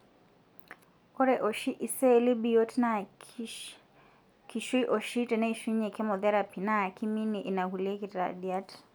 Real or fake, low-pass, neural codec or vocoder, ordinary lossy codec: real; none; none; none